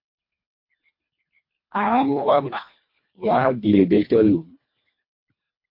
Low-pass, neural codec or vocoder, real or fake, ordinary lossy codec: 5.4 kHz; codec, 24 kHz, 1.5 kbps, HILCodec; fake; MP3, 32 kbps